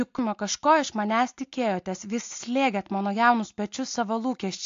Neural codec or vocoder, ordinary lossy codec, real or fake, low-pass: none; AAC, 48 kbps; real; 7.2 kHz